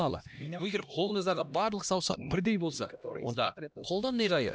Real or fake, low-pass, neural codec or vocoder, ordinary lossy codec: fake; none; codec, 16 kHz, 1 kbps, X-Codec, HuBERT features, trained on LibriSpeech; none